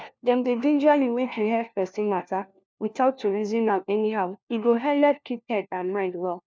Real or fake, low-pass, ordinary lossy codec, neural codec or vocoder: fake; none; none; codec, 16 kHz, 1 kbps, FunCodec, trained on LibriTTS, 50 frames a second